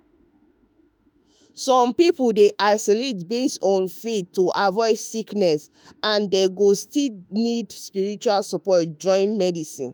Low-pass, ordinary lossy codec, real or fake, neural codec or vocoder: none; none; fake; autoencoder, 48 kHz, 32 numbers a frame, DAC-VAE, trained on Japanese speech